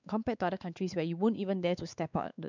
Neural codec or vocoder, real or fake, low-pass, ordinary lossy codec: codec, 16 kHz, 4 kbps, X-Codec, WavLM features, trained on Multilingual LibriSpeech; fake; 7.2 kHz; none